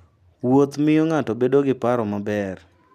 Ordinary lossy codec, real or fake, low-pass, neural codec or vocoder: none; real; 14.4 kHz; none